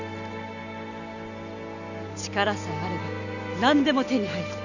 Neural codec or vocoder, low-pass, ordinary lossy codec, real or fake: none; 7.2 kHz; none; real